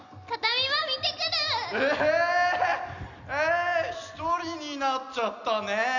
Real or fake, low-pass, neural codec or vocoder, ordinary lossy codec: real; 7.2 kHz; none; none